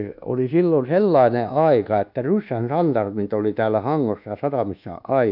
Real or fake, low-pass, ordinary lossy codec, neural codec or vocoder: fake; 5.4 kHz; none; codec, 24 kHz, 1.2 kbps, DualCodec